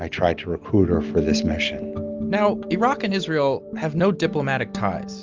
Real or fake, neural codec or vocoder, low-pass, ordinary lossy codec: real; none; 7.2 kHz; Opus, 32 kbps